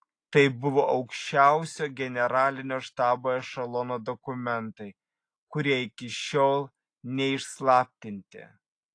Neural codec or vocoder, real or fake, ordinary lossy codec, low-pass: none; real; AAC, 48 kbps; 9.9 kHz